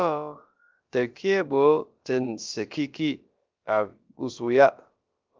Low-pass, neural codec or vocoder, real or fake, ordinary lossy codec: 7.2 kHz; codec, 16 kHz, about 1 kbps, DyCAST, with the encoder's durations; fake; Opus, 32 kbps